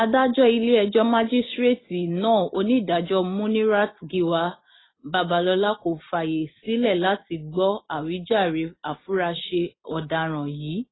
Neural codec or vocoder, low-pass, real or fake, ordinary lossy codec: none; 7.2 kHz; real; AAC, 16 kbps